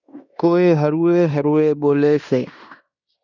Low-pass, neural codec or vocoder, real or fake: 7.2 kHz; codec, 16 kHz in and 24 kHz out, 0.9 kbps, LongCat-Audio-Codec, fine tuned four codebook decoder; fake